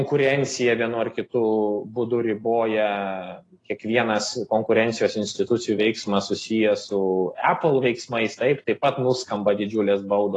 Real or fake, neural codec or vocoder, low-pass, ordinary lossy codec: real; none; 10.8 kHz; AAC, 32 kbps